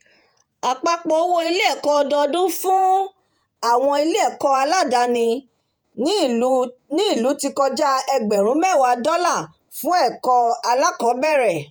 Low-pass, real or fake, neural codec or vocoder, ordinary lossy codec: none; fake; vocoder, 48 kHz, 128 mel bands, Vocos; none